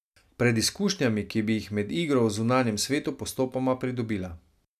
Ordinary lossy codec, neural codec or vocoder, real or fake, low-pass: none; none; real; 14.4 kHz